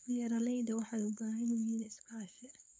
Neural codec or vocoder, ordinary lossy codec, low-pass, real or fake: codec, 16 kHz, 4.8 kbps, FACodec; none; none; fake